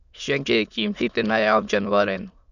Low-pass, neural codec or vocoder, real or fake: 7.2 kHz; autoencoder, 22.05 kHz, a latent of 192 numbers a frame, VITS, trained on many speakers; fake